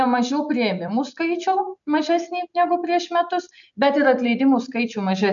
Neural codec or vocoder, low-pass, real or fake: none; 7.2 kHz; real